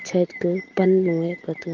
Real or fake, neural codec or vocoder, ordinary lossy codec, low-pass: real; none; Opus, 24 kbps; 7.2 kHz